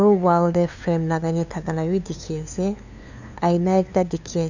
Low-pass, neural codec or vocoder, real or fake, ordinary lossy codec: 7.2 kHz; codec, 16 kHz, 2 kbps, FunCodec, trained on LibriTTS, 25 frames a second; fake; none